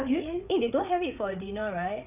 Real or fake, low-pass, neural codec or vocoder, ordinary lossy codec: fake; 3.6 kHz; codec, 16 kHz, 8 kbps, FreqCodec, larger model; none